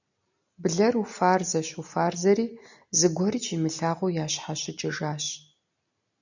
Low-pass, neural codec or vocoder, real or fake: 7.2 kHz; none; real